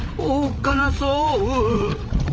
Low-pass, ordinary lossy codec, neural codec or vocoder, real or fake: none; none; codec, 16 kHz, 8 kbps, FreqCodec, larger model; fake